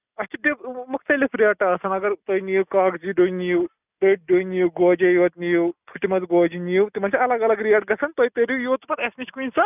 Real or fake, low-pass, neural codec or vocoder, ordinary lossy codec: real; 3.6 kHz; none; none